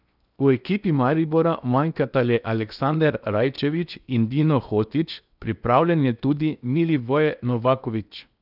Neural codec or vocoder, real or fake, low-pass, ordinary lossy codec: codec, 16 kHz in and 24 kHz out, 0.8 kbps, FocalCodec, streaming, 65536 codes; fake; 5.4 kHz; none